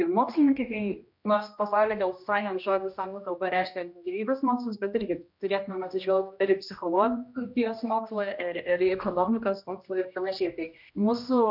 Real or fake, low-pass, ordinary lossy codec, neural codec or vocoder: fake; 5.4 kHz; MP3, 48 kbps; codec, 16 kHz, 1 kbps, X-Codec, HuBERT features, trained on general audio